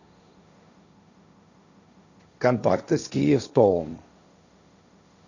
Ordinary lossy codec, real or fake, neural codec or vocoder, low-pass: none; fake; codec, 16 kHz, 1.1 kbps, Voila-Tokenizer; 7.2 kHz